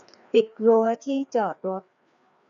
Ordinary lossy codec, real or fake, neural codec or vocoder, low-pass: none; fake; codec, 16 kHz, 2 kbps, FreqCodec, larger model; 7.2 kHz